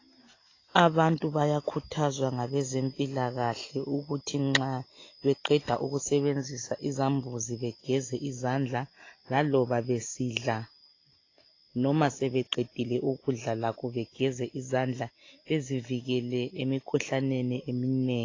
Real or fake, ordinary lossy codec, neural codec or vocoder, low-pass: real; AAC, 32 kbps; none; 7.2 kHz